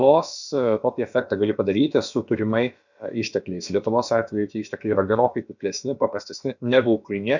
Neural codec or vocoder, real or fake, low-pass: codec, 16 kHz, about 1 kbps, DyCAST, with the encoder's durations; fake; 7.2 kHz